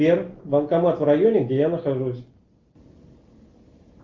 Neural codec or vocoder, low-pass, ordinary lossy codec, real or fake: none; 7.2 kHz; Opus, 24 kbps; real